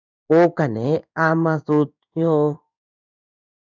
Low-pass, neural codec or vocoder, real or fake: 7.2 kHz; codec, 16 kHz in and 24 kHz out, 1 kbps, XY-Tokenizer; fake